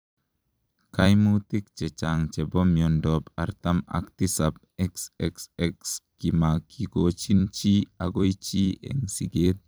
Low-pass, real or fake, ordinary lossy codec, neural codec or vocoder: none; fake; none; vocoder, 44.1 kHz, 128 mel bands every 256 samples, BigVGAN v2